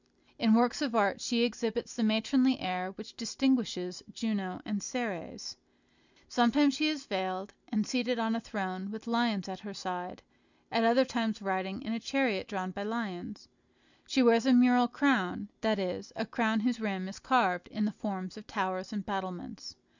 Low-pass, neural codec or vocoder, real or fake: 7.2 kHz; none; real